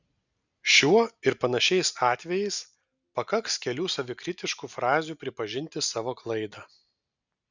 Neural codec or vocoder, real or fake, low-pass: none; real; 7.2 kHz